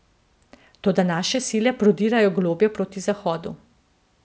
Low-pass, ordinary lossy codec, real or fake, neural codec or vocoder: none; none; real; none